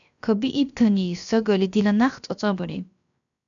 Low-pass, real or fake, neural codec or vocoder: 7.2 kHz; fake; codec, 16 kHz, about 1 kbps, DyCAST, with the encoder's durations